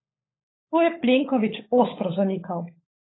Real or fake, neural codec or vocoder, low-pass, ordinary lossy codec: fake; codec, 16 kHz, 16 kbps, FunCodec, trained on LibriTTS, 50 frames a second; 7.2 kHz; AAC, 16 kbps